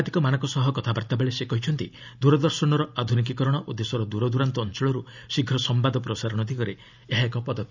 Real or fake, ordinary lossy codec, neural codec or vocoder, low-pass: real; none; none; 7.2 kHz